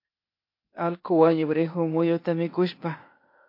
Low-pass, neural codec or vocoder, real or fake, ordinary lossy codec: 5.4 kHz; codec, 16 kHz, 0.8 kbps, ZipCodec; fake; MP3, 32 kbps